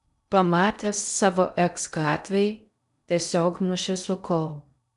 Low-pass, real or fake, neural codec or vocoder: 10.8 kHz; fake; codec, 16 kHz in and 24 kHz out, 0.6 kbps, FocalCodec, streaming, 2048 codes